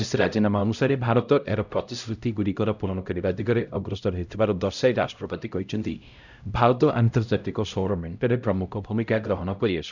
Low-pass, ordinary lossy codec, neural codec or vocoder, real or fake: 7.2 kHz; none; codec, 16 kHz, 0.5 kbps, X-Codec, HuBERT features, trained on LibriSpeech; fake